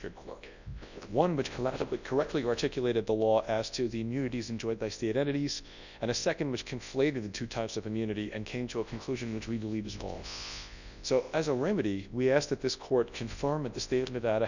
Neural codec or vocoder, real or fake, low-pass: codec, 24 kHz, 0.9 kbps, WavTokenizer, large speech release; fake; 7.2 kHz